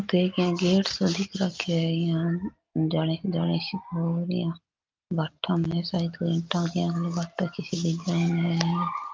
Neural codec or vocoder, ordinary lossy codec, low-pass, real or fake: none; Opus, 24 kbps; 7.2 kHz; real